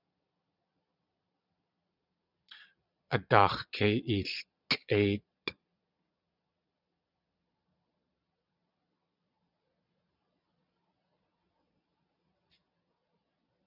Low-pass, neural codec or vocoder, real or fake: 5.4 kHz; vocoder, 22.05 kHz, 80 mel bands, Vocos; fake